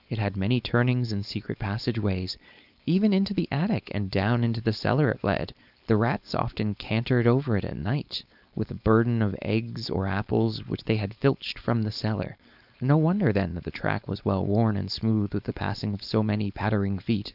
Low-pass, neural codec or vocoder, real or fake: 5.4 kHz; codec, 16 kHz, 4.8 kbps, FACodec; fake